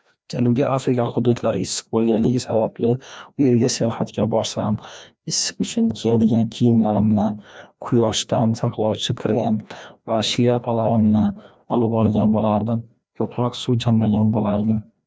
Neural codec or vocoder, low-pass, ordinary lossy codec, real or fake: codec, 16 kHz, 1 kbps, FreqCodec, larger model; none; none; fake